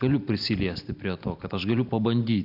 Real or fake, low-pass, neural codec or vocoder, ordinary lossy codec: real; 5.4 kHz; none; AAC, 48 kbps